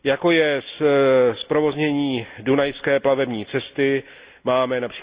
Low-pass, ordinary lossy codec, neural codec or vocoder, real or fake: 3.6 kHz; Opus, 64 kbps; none; real